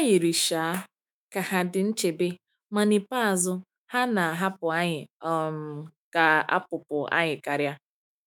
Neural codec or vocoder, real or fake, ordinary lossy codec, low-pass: autoencoder, 48 kHz, 128 numbers a frame, DAC-VAE, trained on Japanese speech; fake; none; none